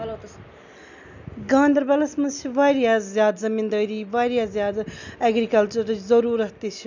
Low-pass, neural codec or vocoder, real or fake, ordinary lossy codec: 7.2 kHz; none; real; none